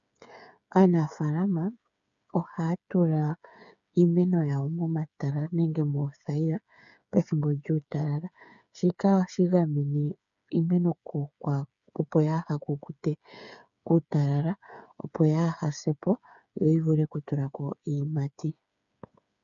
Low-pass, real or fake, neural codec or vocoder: 7.2 kHz; fake; codec, 16 kHz, 8 kbps, FreqCodec, smaller model